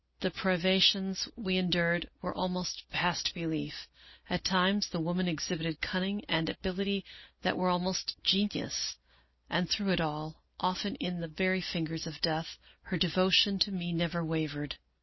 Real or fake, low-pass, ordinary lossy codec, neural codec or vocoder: real; 7.2 kHz; MP3, 24 kbps; none